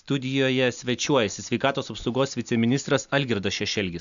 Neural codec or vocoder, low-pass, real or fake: none; 7.2 kHz; real